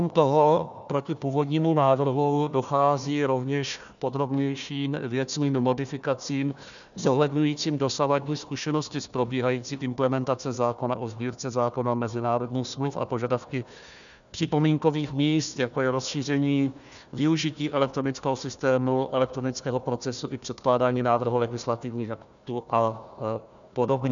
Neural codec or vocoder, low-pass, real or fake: codec, 16 kHz, 1 kbps, FunCodec, trained on Chinese and English, 50 frames a second; 7.2 kHz; fake